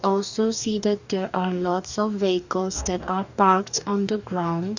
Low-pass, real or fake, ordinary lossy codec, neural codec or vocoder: 7.2 kHz; fake; none; codec, 44.1 kHz, 2.6 kbps, DAC